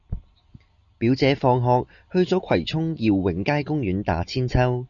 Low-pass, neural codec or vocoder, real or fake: 7.2 kHz; none; real